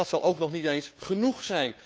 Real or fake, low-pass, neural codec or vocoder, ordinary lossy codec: fake; none; codec, 16 kHz, 2 kbps, FunCodec, trained on Chinese and English, 25 frames a second; none